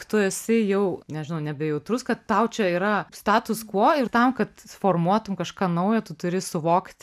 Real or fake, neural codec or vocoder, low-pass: real; none; 14.4 kHz